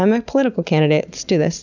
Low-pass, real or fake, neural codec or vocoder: 7.2 kHz; real; none